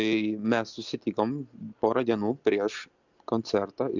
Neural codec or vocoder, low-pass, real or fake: none; 7.2 kHz; real